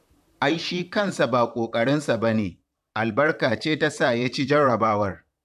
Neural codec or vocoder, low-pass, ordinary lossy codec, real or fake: vocoder, 44.1 kHz, 128 mel bands, Pupu-Vocoder; 14.4 kHz; none; fake